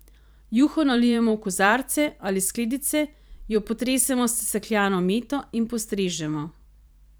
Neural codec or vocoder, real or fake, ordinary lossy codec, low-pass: vocoder, 44.1 kHz, 128 mel bands every 512 samples, BigVGAN v2; fake; none; none